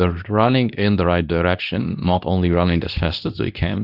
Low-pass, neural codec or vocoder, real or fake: 5.4 kHz; codec, 24 kHz, 0.9 kbps, WavTokenizer, medium speech release version 2; fake